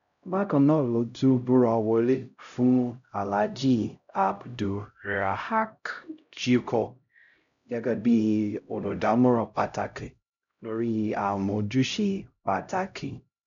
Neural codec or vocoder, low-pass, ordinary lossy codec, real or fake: codec, 16 kHz, 0.5 kbps, X-Codec, HuBERT features, trained on LibriSpeech; 7.2 kHz; none; fake